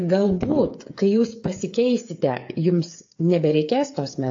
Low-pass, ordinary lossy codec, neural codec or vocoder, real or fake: 7.2 kHz; AAC, 48 kbps; codec, 16 kHz, 8 kbps, FreqCodec, smaller model; fake